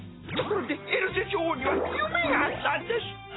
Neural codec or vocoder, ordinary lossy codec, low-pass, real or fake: none; AAC, 16 kbps; 7.2 kHz; real